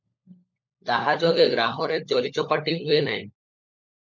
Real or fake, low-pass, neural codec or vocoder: fake; 7.2 kHz; codec, 16 kHz, 16 kbps, FunCodec, trained on LibriTTS, 50 frames a second